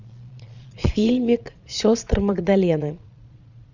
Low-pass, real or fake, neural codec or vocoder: 7.2 kHz; fake; vocoder, 22.05 kHz, 80 mel bands, Vocos